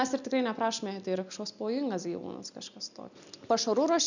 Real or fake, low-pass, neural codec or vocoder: real; 7.2 kHz; none